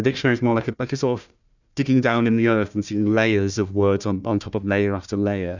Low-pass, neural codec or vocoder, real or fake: 7.2 kHz; codec, 16 kHz, 1 kbps, FunCodec, trained on Chinese and English, 50 frames a second; fake